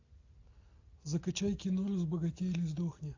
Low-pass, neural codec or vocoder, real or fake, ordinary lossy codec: 7.2 kHz; none; real; AAC, 48 kbps